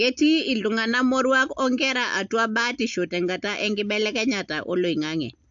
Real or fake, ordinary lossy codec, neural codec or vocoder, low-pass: real; AAC, 64 kbps; none; 7.2 kHz